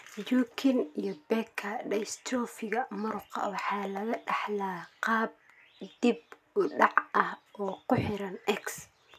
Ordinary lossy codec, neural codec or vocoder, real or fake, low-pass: MP3, 96 kbps; none; real; 14.4 kHz